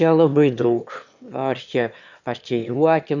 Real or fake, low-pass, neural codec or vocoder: fake; 7.2 kHz; autoencoder, 22.05 kHz, a latent of 192 numbers a frame, VITS, trained on one speaker